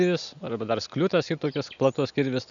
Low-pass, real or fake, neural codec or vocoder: 7.2 kHz; real; none